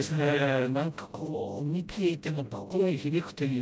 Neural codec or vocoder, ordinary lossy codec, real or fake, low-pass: codec, 16 kHz, 0.5 kbps, FreqCodec, smaller model; none; fake; none